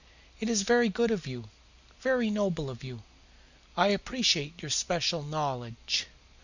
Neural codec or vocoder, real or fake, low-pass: none; real; 7.2 kHz